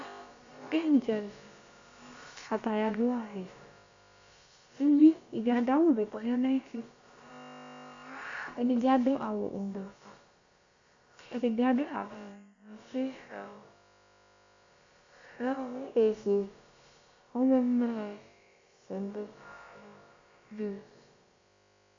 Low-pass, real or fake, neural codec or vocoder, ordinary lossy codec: 7.2 kHz; fake; codec, 16 kHz, about 1 kbps, DyCAST, with the encoder's durations; Opus, 64 kbps